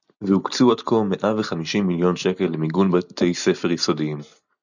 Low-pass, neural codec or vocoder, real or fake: 7.2 kHz; none; real